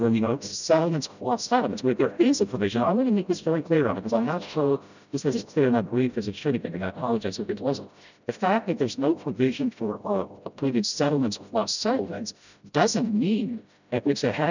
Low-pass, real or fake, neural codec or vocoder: 7.2 kHz; fake; codec, 16 kHz, 0.5 kbps, FreqCodec, smaller model